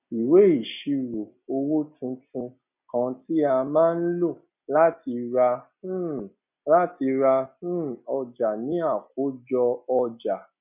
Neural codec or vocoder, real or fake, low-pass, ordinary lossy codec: none; real; 3.6 kHz; none